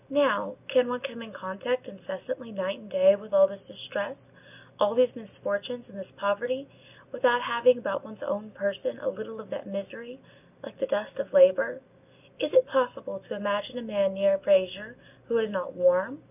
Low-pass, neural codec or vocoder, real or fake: 3.6 kHz; none; real